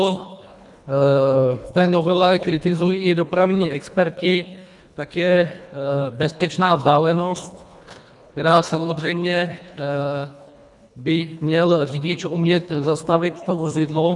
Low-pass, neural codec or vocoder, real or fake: 10.8 kHz; codec, 24 kHz, 1.5 kbps, HILCodec; fake